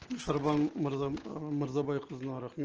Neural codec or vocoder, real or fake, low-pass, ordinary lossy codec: none; real; 7.2 kHz; Opus, 16 kbps